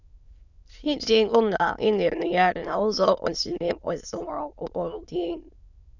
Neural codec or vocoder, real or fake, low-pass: autoencoder, 22.05 kHz, a latent of 192 numbers a frame, VITS, trained on many speakers; fake; 7.2 kHz